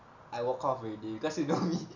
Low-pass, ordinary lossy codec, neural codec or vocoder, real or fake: 7.2 kHz; none; none; real